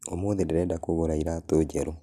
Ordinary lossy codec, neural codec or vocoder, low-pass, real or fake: none; none; 14.4 kHz; real